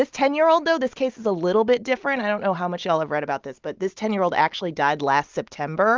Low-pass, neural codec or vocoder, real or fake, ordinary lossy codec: 7.2 kHz; none; real; Opus, 24 kbps